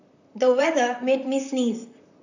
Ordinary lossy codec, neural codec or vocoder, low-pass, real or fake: none; vocoder, 44.1 kHz, 128 mel bands, Pupu-Vocoder; 7.2 kHz; fake